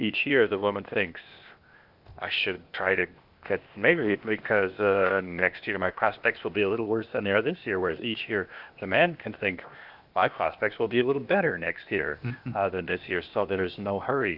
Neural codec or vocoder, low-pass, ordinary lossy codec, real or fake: codec, 16 kHz, 0.8 kbps, ZipCodec; 5.4 kHz; Opus, 64 kbps; fake